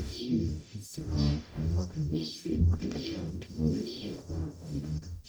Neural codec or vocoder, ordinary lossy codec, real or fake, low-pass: codec, 44.1 kHz, 0.9 kbps, DAC; none; fake; none